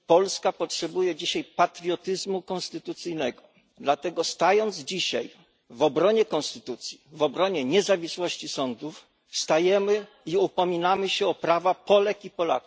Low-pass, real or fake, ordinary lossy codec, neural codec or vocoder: none; real; none; none